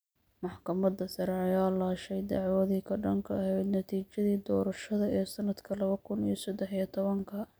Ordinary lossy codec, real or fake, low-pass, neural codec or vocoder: none; real; none; none